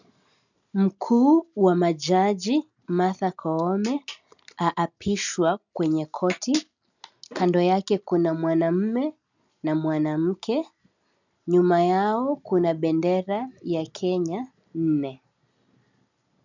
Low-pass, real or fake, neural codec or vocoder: 7.2 kHz; real; none